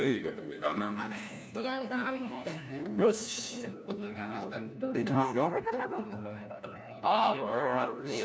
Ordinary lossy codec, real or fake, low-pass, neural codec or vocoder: none; fake; none; codec, 16 kHz, 1 kbps, FunCodec, trained on LibriTTS, 50 frames a second